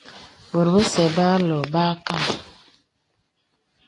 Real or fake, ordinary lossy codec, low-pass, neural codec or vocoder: real; AAC, 32 kbps; 10.8 kHz; none